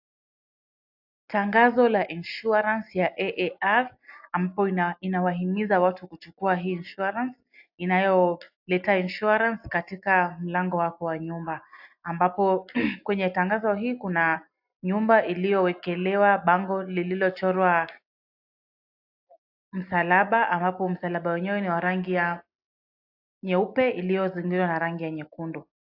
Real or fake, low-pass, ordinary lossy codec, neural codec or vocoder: real; 5.4 kHz; AAC, 48 kbps; none